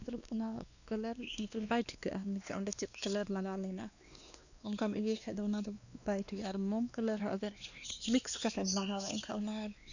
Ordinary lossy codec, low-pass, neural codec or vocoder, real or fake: none; 7.2 kHz; codec, 16 kHz, 2 kbps, X-Codec, WavLM features, trained on Multilingual LibriSpeech; fake